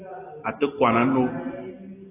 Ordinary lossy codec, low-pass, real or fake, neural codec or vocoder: AAC, 16 kbps; 3.6 kHz; real; none